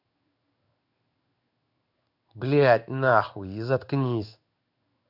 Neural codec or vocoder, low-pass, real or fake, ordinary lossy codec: codec, 16 kHz in and 24 kHz out, 1 kbps, XY-Tokenizer; 5.4 kHz; fake; none